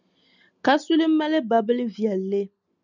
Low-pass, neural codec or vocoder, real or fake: 7.2 kHz; none; real